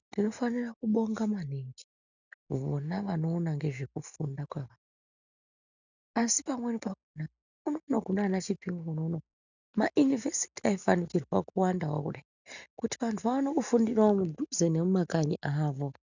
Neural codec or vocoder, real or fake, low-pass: none; real; 7.2 kHz